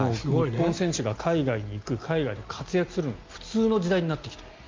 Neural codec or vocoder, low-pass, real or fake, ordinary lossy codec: none; 7.2 kHz; real; Opus, 32 kbps